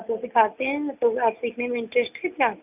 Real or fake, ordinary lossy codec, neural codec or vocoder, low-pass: real; none; none; 3.6 kHz